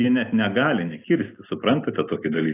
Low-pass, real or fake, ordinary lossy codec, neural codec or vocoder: 3.6 kHz; real; AAC, 16 kbps; none